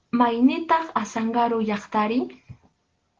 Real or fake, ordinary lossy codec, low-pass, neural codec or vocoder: real; Opus, 16 kbps; 7.2 kHz; none